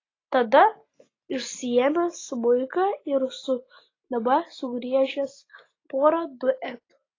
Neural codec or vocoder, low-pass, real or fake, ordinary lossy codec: none; 7.2 kHz; real; AAC, 32 kbps